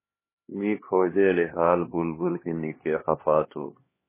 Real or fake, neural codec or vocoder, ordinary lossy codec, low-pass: fake; codec, 16 kHz, 2 kbps, X-Codec, HuBERT features, trained on LibriSpeech; MP3, 16 kbps; 3.6 kHz